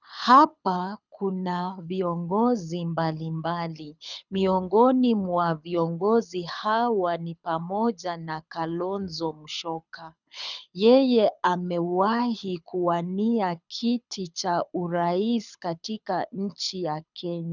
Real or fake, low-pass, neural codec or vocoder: fake; 7.2 kHz; codec, 24 kHz, 6 kbps, HILCodec